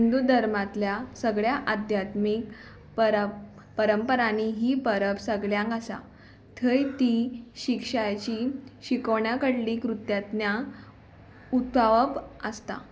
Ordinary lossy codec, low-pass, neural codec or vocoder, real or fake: none; none; none; real